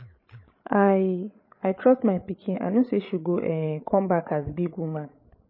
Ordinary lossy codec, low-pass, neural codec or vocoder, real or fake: MP3, 24 kbps; 5.4 kHz; codec, 16 kHz, 16 kbps, FreqCodec, larger model; fake